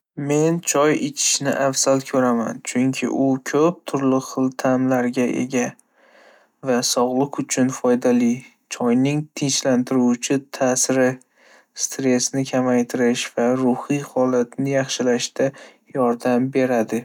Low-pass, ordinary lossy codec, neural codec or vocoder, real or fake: 19.8 kHz; none; none; real